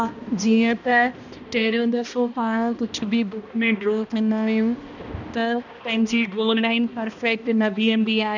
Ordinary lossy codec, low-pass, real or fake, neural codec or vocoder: none; 7.2 kHz; fake; codec, 16 kHz, 1 kbps, X-Codec, HuBERT features, trained on balanced general audio